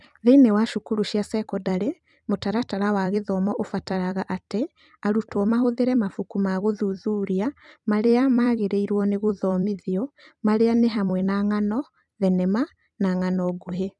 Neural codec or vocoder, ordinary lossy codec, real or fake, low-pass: vocoder, 44.1 kHz, 128 mel bands every 256 samples, BigVGAN v2; none; fake; 10.8 kHz